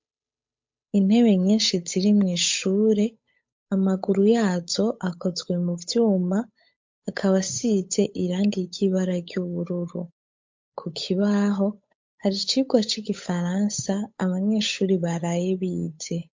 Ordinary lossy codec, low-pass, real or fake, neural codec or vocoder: MP3, 48 kbps; 7.2 kHz; fake; codec, 16 kHz, 8 kbps, FunCodec, trained on Chinese and English, 25 frames a second